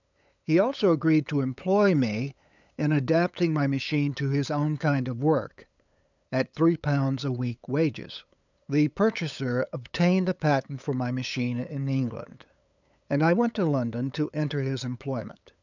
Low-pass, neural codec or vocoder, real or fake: 7.2 kHz; codec, 16 kHz, 8 kbps, FunCodec, trained on LibriTTS, 25 frames a second; fake